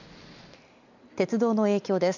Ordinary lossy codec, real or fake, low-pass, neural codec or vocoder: none; real; 7.2 kHz; none